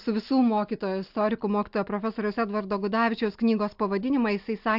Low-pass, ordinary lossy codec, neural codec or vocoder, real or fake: 5.4 kHz; AAC, 48 kbps; none; real